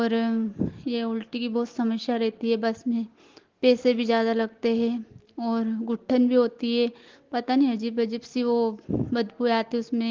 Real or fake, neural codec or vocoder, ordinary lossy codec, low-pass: real; none; Opus, 16 kbps; 7.2 kHz